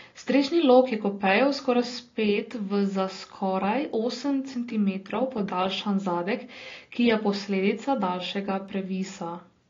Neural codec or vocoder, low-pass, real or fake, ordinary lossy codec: none; 7.2 kHz; real; AAC, 32 kbps